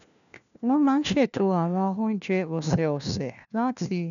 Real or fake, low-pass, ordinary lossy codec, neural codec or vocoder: fake; 7.2 kHz; none; codec, 16 kHz, 1 kbps, FunCodec, trained on LibriTTS, 50 frames a second